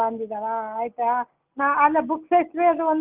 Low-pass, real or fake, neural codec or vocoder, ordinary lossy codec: 3.6 kHz; real; none; Opus, 32 kbps